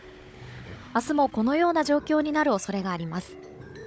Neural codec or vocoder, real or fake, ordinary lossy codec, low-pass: codec, 16 kHz, 16 kbps, FunCodec, trained on Chinese and English, 50 frames a second; fake; none; none